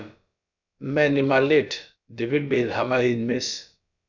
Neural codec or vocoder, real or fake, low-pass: codec, 16 kHz, about 1 kbps, DyCAST, with the encoder's durations; fake; 7.2 kHz